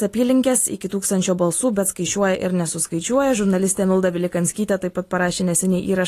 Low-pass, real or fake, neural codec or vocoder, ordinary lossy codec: 14.4 kHz; real; none; AAC, 48 kbps